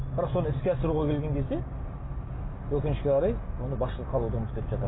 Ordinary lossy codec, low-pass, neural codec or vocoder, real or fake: AAC, 16 kbps; 7.2 kHz; none; real